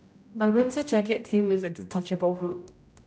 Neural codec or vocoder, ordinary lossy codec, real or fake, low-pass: codec, 16 kHz, 0.5 kbps, X-Codec, HuBERT features, trained on general audio; none; fake; none